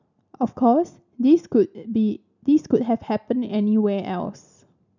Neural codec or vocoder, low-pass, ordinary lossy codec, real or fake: none; 7.2 kHz; none; real